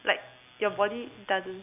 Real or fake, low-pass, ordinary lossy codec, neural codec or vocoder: real; 3.6 kHz; none; none